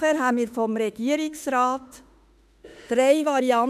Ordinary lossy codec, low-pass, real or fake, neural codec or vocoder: none; 14.4 kHz; fake; autoencoder, 48 kHz, 32 numbers a frame, DAC-VAE, trained on Japanese speech